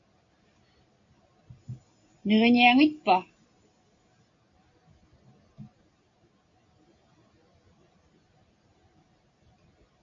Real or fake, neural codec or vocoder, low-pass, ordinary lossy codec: real; none; 7.2 kHz; AAC, 48 kbps